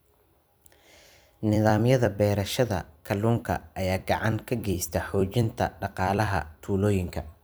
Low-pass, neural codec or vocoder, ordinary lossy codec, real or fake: none; vocoder, 44.1 kHz, 128 mel bands every 256 samples, BigVGAN v2; none; fake